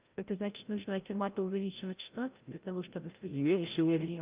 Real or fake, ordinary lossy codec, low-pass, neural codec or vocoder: fake; Opus, 24 kbps; 3.6 kHz; codec, 16 kHz, 0.5 kbps, FreqCodec, larger model